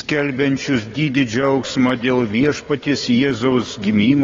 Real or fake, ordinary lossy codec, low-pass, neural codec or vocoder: fake; AAC, 32 kbps; 7.2 kHz; codec, 16 kHz, 4 kbps, FunCodec, trained on Chinese and English, 50 frames a second